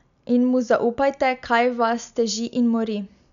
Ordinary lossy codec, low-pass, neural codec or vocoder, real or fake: none; 7.2 kHz; none; real